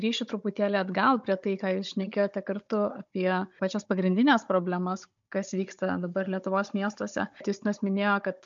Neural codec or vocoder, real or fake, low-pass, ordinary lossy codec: codec, 16 kHz, 16 kbps, FunCodec, trained on Chinese and English, 50 frames a second; fake; 7.2 kHz; MP3, 64 kbps